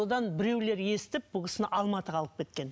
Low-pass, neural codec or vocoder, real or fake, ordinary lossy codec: none; none; real; none